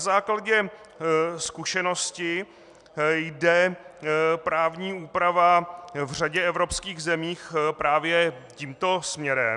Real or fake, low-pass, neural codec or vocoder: real; 10.8 kHz; none